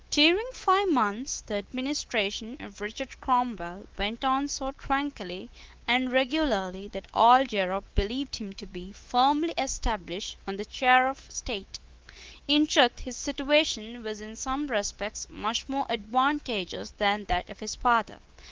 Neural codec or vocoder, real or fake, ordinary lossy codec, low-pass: codec, 24 kHz, 3.1 kbps, DualCodec; fake; Opus, 16 kbps; 7.2 kHz